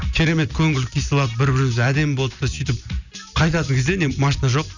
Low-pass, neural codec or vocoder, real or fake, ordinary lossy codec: 7.2 kHz; none; real; none